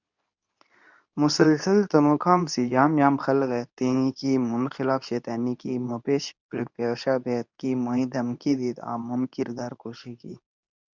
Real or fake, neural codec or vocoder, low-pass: fake; codec, 24 kHz, 0.9 kbps, WavTokenizer, medium speech release version 2; 7.2 kHz